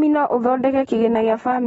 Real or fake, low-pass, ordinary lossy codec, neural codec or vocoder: real; 9.9 kHz; AAC, 24 kbps; none